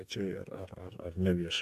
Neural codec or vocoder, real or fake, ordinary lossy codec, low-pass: codec, 44.1 kHz, 2.6 kbps, DAC; fake; AAC, 96 kbps; 14.4 kHz